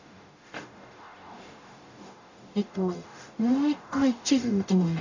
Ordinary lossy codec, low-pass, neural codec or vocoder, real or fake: none; 7.2 kHz; codec, 44.1 kHz, 0.9 kbps, DAC; fake